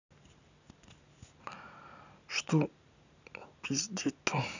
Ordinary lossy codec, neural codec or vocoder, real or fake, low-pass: none; none; real; 7.2 kHz